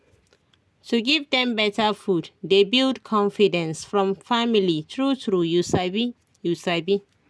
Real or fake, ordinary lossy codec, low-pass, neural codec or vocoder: real; none; none; none